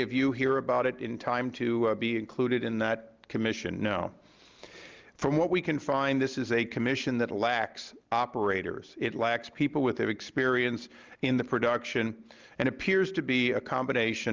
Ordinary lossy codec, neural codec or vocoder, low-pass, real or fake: Opus, 32 kbps; none; 7.2 kHz; real